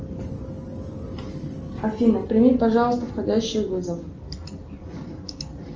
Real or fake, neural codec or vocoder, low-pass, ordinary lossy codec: real; none; 7.2 kHz; Opus, 24 kbps